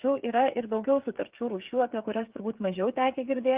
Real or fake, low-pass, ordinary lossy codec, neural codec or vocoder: fake; 3.6 kHz; Opus, 32 kbps; codec, 16 kHz, 8 kbps, FreqCodec, smaller model